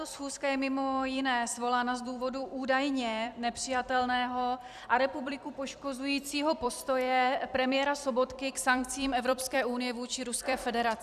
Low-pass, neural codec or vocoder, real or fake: 14.4 kHz; vocoder, 44.1 kHz, 128 mel bands every 256 samples, BigVGAN v2; fake